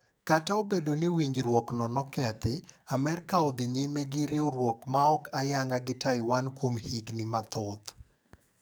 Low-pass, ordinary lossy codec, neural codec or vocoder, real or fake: none; none; codec, 44.1 kHz, 2.6 kbps, SNAC; fake